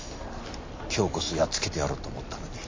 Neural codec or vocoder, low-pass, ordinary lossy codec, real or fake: none; 7.2 kHz; MP3, 32 kbps; real